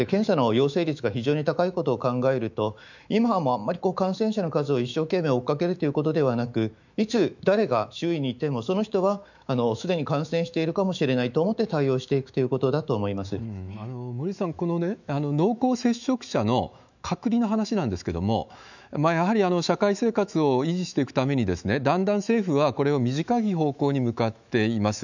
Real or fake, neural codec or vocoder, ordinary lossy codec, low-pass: fake; autoencoder, 48 kHz, 128 numbers a frame, DAC-VAE, trained on Japanese speech; none; 7.2 kHz